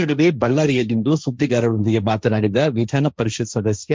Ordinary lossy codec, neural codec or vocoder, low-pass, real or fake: none; codec, 16 kHz, 1.1 kbps, Voila-Tokenizer; none; fake